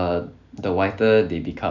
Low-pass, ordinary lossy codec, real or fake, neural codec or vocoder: 7.2 kHz; none; real; none